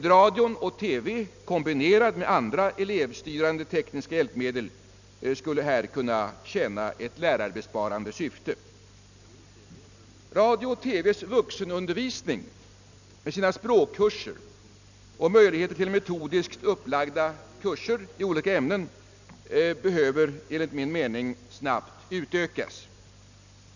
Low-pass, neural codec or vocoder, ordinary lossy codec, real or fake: 7.2 kHz; none; none; real